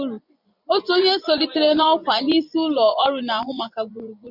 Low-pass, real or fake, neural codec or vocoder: 5.4 kHz; fake; vocoder, 24 kHz, 100 mel bands, Vocos